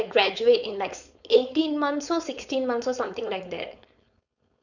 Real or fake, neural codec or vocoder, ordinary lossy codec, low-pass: fake; codec, 16 kHz, 4.8 kbps, FACodec; none; 7.2 kHz